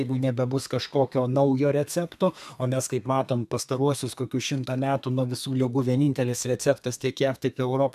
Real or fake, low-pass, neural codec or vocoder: fake; 14.4 kHz; codec, 32 kHz, 1.9 kbps, SNAC